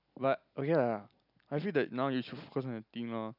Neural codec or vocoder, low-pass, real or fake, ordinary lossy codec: none; 5.4 kHz; real; none